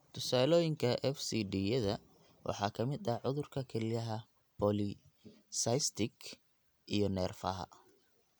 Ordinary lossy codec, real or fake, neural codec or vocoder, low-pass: none; real; none; none